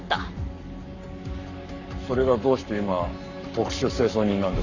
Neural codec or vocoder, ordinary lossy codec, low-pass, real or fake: codec, 44.1 kHz, 7.8 kbps, Pupu-Codec; none; 7.2 kHz; fake